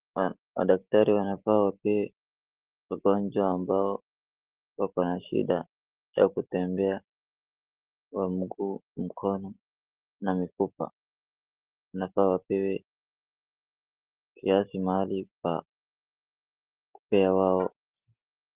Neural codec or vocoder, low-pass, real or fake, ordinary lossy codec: none; 3.6 kHz; real; Opus, 16 kbps